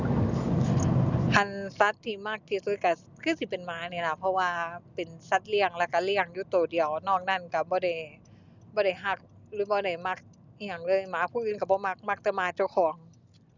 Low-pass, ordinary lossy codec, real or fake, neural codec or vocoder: 7.2 kHz; none; real; none